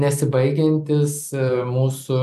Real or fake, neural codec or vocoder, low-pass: real; none; 14.4 kHz